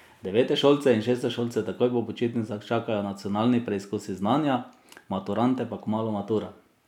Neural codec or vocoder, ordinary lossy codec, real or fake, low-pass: none; none; real; 19.8 kHz